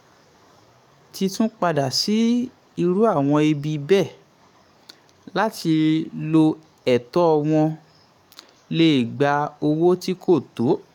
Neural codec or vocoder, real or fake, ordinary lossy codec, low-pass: codec, 44.1 kHz, 7.8 kbps, DAC; fake; none; 19.8 kHz